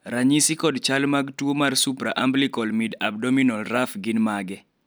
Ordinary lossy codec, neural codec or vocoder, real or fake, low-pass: none; none; real; none